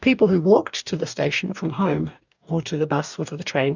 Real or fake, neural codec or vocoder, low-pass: fake; codec, 44.1 kHz, 2.6 kbps, DAC; 7.2 kHz